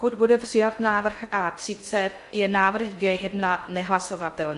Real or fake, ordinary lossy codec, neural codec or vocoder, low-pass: fake; AAC, 64 kbps; codec, 16 kHz in and 24 kHz out, 0.6 kbps, FocalCodec, streaming, 2048 codes; 10.8 kHz